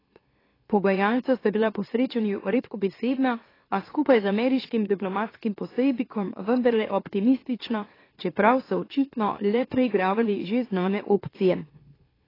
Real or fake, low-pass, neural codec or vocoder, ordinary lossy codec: fake; 5.4 kHz; autoencoder, 44.1 kHz, a latent of 192 numbers a frame, MeloTTS; AAC, 24 kbps